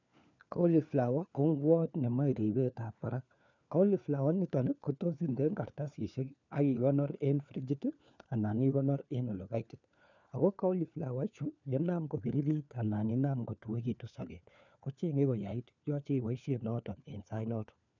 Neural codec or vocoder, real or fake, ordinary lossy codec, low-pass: codec, 16 kHz, 4 kbps, FunCodec, trained on LibriTTS, 50 frames a second; fake; none; 7.2 kHz